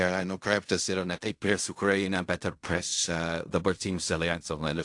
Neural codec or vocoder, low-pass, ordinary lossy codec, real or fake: codec, 16 kHz in and 24 kHz out, 0.4 kbps, LongCat-Audio-Codec, fine tuned four codebook decoder; 10.8 kHz; AAC, 64 kbps; fake